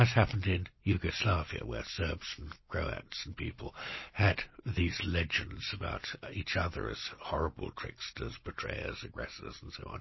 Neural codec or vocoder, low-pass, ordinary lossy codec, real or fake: none; 7.2 kHz; MP3, 24 kbps; real